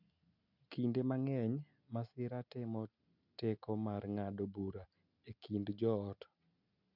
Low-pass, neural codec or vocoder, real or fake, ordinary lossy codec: 5.4 kHz; none; real; none